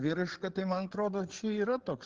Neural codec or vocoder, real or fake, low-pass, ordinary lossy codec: codec, 16 kHz, 8 kbps, FreqCodec, larger model; fake; 7.2 kHz; Opus, 16 kbps